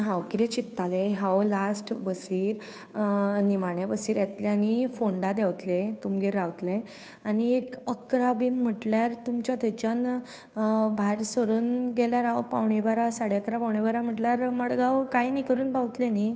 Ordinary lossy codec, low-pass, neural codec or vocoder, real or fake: none; none; codec, 16 kHz, 2 kbps, FunCodec, trained on Chinese and English, 25 frames a second; fake